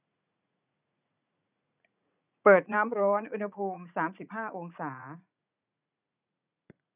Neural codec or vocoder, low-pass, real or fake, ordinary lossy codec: vocoder, 44.1 kHz, 80 mel bands, Vocos; 3.6 kHz; fake; none